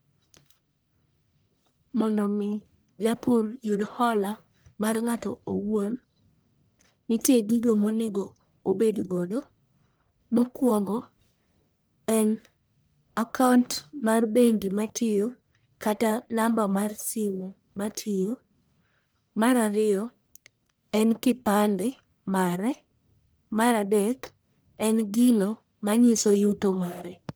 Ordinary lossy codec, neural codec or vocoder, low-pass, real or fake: none; codec, 44.1 kHz, 1.7 kbps, Pupu-Codec; none; fake